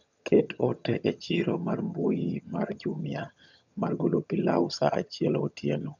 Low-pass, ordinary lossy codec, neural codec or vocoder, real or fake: 7.2 kHz; none; vocoder, 22.05 kHz, 80 mel bands, HiFi-GAN; fake